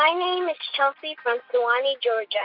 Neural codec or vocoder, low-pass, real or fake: none; 5.4 kHz; real